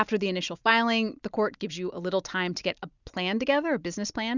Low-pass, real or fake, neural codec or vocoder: 7.2 kHz; real; none